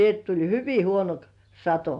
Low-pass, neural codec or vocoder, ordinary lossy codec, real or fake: 10.8 kHz; none; AAC, 64 kbps; real